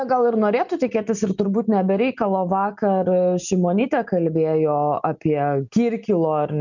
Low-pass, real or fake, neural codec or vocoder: 7.2 kHz; real; none